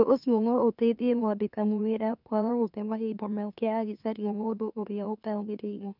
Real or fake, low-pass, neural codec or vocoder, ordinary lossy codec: fake; 5.4 kHz; autoencoder, 44.1 kHz, a latent of 192 numbers a frame, MeloTTS; none